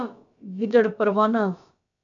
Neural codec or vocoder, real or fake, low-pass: codec, 16 kHz, about 1 kbps, DyCAST, with the encoder's durations; fake; 7.2 kHz